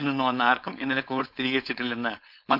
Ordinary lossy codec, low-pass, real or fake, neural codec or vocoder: none; 5.4 kHz; fake; codec, 16 kHz, 4.8 kbps, FACodec